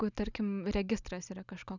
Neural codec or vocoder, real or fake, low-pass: none; real; 7.2 kHz